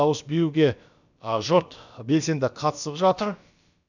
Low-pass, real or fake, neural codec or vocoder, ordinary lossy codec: 7.2 kHz; fake; codec, 16 kHz, about 1 kbps, DyCAST, with the encoder's durations; none